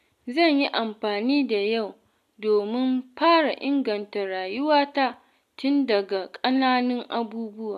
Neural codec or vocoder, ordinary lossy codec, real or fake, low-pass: none; none; real; 14.4 kHz